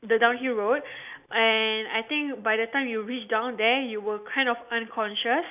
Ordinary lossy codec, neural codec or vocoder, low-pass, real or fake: none; none; 3.6 kHz; real